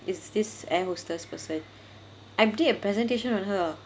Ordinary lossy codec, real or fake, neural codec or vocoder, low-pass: none; real; none; none